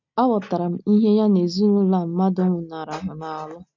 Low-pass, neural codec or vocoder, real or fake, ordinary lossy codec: 7.2 kHz; none; real; none